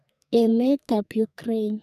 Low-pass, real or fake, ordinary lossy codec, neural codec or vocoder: 14.4 kHz; fake; none; codec, 44.1 kHz, 2.6 kbps, SNAC